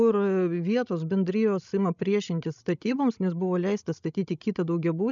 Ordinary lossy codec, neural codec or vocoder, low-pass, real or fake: MP3, 96 kbps; codec, 16 kHz, 16 kbps, FreqCodec, larger model; 7.2 kHz; fake